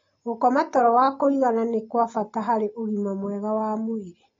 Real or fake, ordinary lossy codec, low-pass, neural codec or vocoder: real; AAC, 32 kbps; 7.2 kHz; none